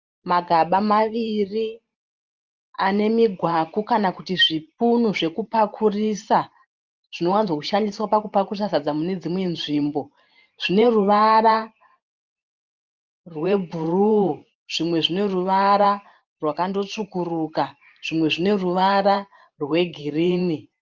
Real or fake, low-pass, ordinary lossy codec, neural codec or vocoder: fake; 7.2 kHz; Opus, 24 kbps; vocoder, 44.1 kHz, 128 mel bands every 512 samples, BigVGAN v2